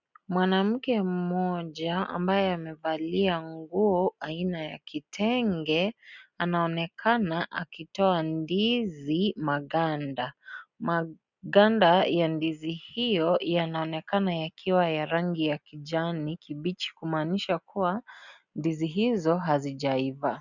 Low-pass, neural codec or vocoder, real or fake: 7.2 kHz; none; real